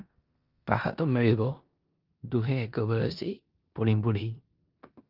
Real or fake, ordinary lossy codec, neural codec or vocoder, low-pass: fake; Opus, 24 kbps; codec, 16 kHz in and 24 kHz out, 0.9 kbps, LongCat-Audio-Codec, four codebook decoder; 5.4 kHz